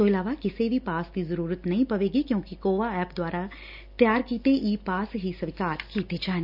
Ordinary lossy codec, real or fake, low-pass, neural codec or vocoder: none; real; 5.4 kHz; none